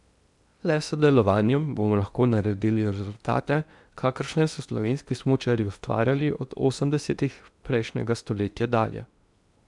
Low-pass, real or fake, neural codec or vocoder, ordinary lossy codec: 10.8 kHz; fake; codec, 16 kHz in and 24 kHz out, 0.8 kbps, FocalCodec, streaming, 65536 codes; none